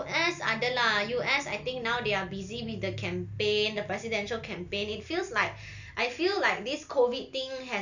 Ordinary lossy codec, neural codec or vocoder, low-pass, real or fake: none; none; 7.2 kHz; real